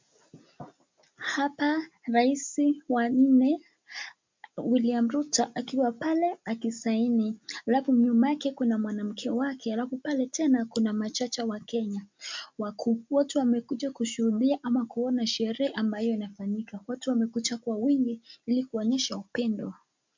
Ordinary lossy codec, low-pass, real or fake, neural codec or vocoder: MP3, 64 kbps; 7.2 kHz; real; none